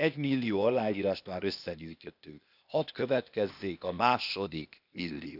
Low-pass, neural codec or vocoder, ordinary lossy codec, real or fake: 5.4 kHz; codec, 16 kHz, 0.8 kbps, ZipCodec; none; fake